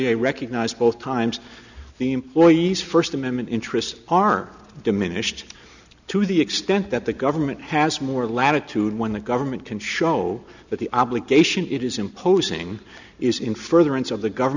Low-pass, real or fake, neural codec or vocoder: 7.2 kHz; real; none